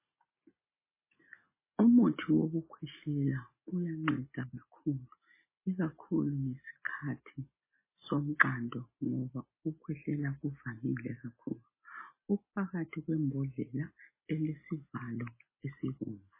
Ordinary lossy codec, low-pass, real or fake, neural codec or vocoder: MP3, 16 kbps; 3.6 kHz; real; none